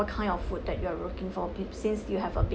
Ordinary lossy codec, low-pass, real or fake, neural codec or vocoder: none; none; real; none